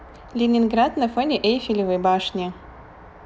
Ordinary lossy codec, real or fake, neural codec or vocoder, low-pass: none; real; none; none